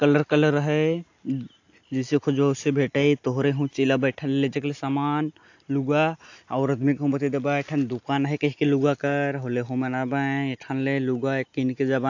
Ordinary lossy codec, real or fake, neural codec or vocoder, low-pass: AAC, 48 kbps; real; none; 7.2 kHz